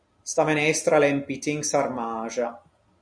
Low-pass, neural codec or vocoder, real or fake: 9.9 kHz; none; real